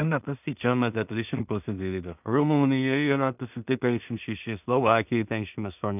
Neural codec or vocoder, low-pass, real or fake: codec, 16 kHz in and 24 kHz out, 0.4 kbps, LongCat-Audio-Codec, two codebook decoder; 3.6 kHz; fake